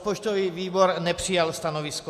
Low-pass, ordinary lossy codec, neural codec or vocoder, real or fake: 14.4 kHz; AAC, 96 kbps; none; real